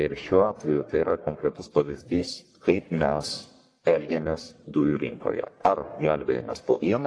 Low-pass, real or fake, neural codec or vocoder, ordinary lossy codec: 9.9 kHz; fake; codec, 44.1 kHz, 1.7 kbps, Pupu-Codec; AAC, 48 kbps